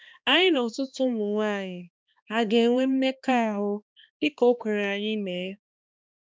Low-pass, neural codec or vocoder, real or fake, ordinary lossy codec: none; codec, 16 kHz, 2 kbps, X-Codec, HuBERT features, trained on balanced general audio; fake; none